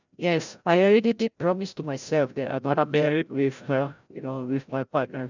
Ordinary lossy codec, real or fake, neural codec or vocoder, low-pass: none; fake; codec, 16 kHz, 0.5 kbps, FreqCodec, larger model; 7.2 kHz